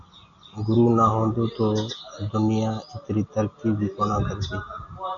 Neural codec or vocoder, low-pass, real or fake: none; 7.2 kHz; real